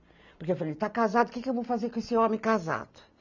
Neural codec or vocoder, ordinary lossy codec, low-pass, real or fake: none; none; 7.2 kHz; real